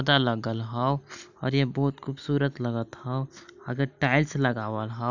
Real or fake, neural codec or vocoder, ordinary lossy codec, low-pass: real; none; none; 7.2 kHz